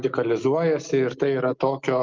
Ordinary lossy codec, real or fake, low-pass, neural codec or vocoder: Opus, 24 kbps; real; 7.2 kHz; none